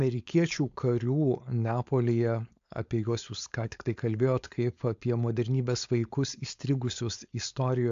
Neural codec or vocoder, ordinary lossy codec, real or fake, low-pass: codec, 16 kHz, 4.8 kbps, FACodec; AAC, 64 kbps; fake; 7.2 kHz